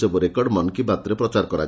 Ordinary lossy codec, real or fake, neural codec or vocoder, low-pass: none; real; none; none